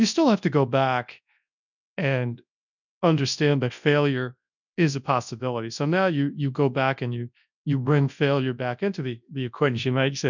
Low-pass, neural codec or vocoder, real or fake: 7.2 kHz; codec, 24 kHz, 0.9 kbps, WavTokenizer, large speech release; fake